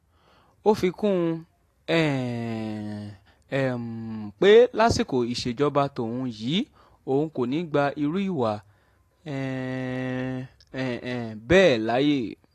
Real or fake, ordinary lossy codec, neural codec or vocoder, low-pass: real; AAC, 48 kbps; none; 14.4 kHz